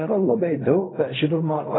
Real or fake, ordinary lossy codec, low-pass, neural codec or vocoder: fake; AAC, 16 kbps; 7.2 kHz; codec, 16 kHz in and 24 kHz out, 0.4 kbps, LongCat-Audio-Codec, fine tuned four codebook decoder